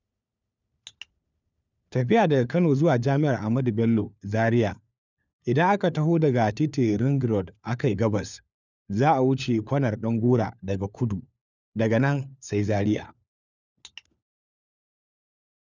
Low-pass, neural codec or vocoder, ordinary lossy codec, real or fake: 7.2 kHz; codec, 16 kHz, 4 kbps, FunCodec, trained on LibriTTS, 50 frames a second; none; fake